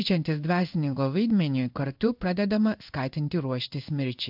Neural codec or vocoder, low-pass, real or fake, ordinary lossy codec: codec, 16 kHz in and 24 kHz out, 1 kbps, XY-Tokenizer; 5.4 kHz; fake; AAC, 48 kbps